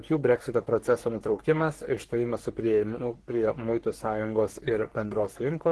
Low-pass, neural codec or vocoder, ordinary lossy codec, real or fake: 10.8 kHz; codec, 44.1 kHz, 3.4 kbps, Pupu-Codec; Opus, 16 kbps; fake